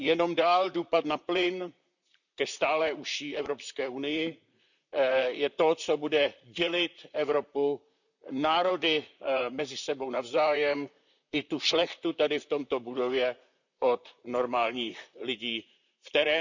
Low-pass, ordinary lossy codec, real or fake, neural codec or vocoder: 7.2 kHz; none; fake; vocoder, 44.1 kHz, 128 mel bands, Pupu-Vocoder